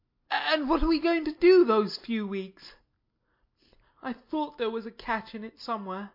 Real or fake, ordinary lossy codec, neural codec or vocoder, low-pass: real; MP3, 32 kbps; none; 5.4 kHz